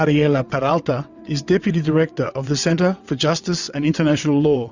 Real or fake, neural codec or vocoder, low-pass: fake; vocoder, 22.05 kHz, 80 mel bands, WaveNeXt; 7.2 kHz